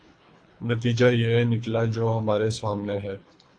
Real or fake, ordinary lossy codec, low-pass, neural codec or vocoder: fake; AAC, 48 kbps; 9.9 kHz; codec, 24 kHz, 3 kbps, HILCodec